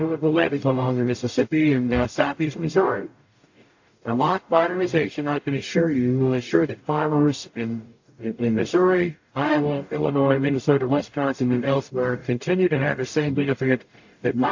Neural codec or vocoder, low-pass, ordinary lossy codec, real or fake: codec, 44.1 kHz, 0.9 kbps, DAC; 7.2 kHz; AAC, 48 kbps; fake